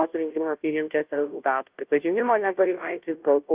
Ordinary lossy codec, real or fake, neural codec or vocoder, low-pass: Opus, 24 kbps; fake; codec, 16 kHz, 0.5 kbps, FunCodec, trained on Chinese and English, 25 frames a second; 3.6 kHz